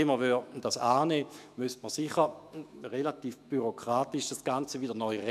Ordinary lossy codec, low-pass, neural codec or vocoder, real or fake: none; 14.4 kHz; autoencoder, 48 kHz, 128 numbers a frame, DAC-VAE, trained on Japanese speech; fake